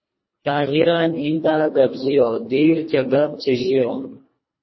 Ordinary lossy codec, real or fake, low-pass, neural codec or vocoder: MP3, 24 kbps; fake; 7.2 kHz; codec, 24 kHz, 1.5 kbps, HILCodec